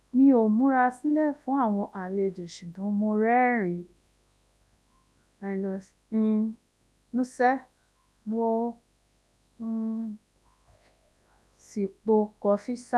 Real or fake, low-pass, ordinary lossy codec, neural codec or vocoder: fake; none; none; codec, 24 kHz, 0.9 kbps, WavTokenizer, large speech release